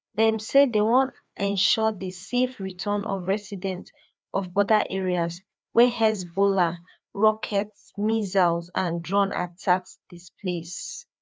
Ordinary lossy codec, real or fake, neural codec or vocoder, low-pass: none; fake; codec, 16 kHz, 2 kbps, FreqCodec, larger model; none